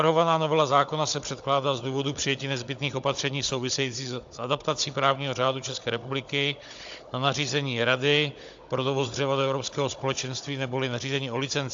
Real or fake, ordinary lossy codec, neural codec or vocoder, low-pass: fake; AAC, 64 kbps; codec, 16 kHz, 16 kbps, FunCodec, trained on Chinese and English, 50 frames a second; 7.2 kHz